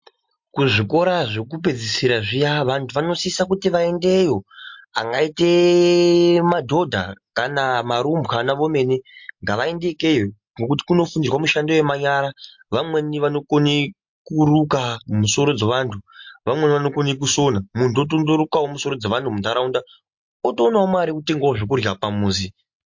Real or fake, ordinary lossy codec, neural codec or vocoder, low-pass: real; MP3, 48 kbps; none; 7.2 kHz